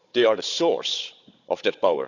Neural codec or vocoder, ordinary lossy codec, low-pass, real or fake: codec, 16 kHz, 16 kbps, FunCodec, trained on Chinese and English, 50 frames a second; none; 7.2 kHz; fake